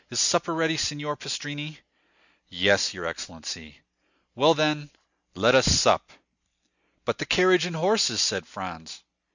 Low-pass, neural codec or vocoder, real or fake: 7.2 kHz; none; real